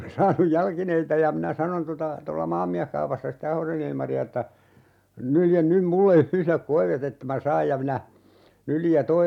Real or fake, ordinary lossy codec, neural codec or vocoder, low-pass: real; none; none; 19.8 kHz